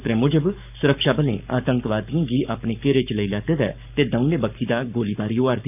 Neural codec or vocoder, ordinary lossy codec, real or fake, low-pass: codec, 44.1 kHz, 7.8 kbps, Pupu-Codec; none; fake; 3.6 kHz